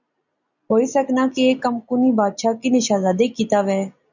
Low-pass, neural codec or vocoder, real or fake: 7.2 kHz; none; real